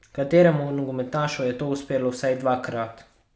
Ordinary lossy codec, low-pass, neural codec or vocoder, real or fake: none; none; none; real